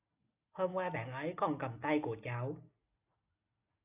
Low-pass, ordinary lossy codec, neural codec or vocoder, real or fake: 3.6 kHz; AAC, 32 kbps; none; real